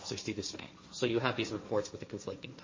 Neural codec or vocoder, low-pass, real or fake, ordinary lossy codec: codec, 16 kHz, 1.1 kbps, Voila-Tokenizer; 7.2 kHz; fake; MP3, 32 kbps